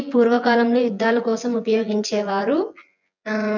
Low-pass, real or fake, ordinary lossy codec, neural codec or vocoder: 7.2 kHz; fake; none; vocoder, 24 kHz, 100 mel bands, Vocos